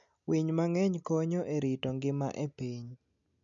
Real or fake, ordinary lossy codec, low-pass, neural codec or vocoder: real; none; 7.2 kHz; none